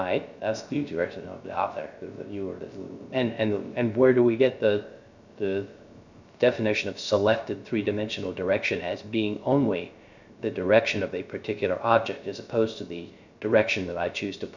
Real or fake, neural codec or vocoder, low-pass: fake; codec, 16 kHz, 0.3 kbps, FocalCodec; 7.2 kHz